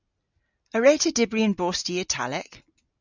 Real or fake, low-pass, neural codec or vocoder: real; 7.2 kHz; none